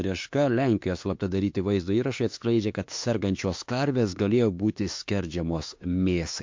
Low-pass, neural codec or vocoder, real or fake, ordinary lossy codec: 7.2 kHz; autoencoder, 48 kHz, 32 numbers a frame, DAC-VAE, trained on Japanese speech; fake; MP3, 48 kbps